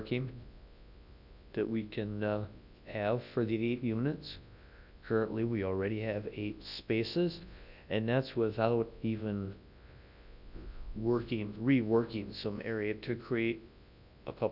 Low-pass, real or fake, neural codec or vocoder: 5.4 kHz; fake; codec, 24 kHz, 0.9 kbps, WavTokenizer, large speech release